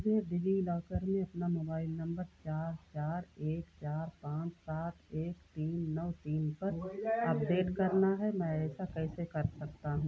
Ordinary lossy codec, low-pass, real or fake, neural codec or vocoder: none; none; real; none